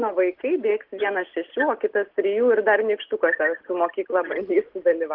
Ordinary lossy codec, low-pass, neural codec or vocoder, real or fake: Opus, 16 kbps; 5.4 kHz; none; real